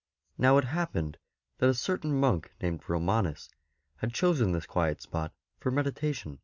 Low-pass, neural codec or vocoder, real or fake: 7.2 kHz; none; real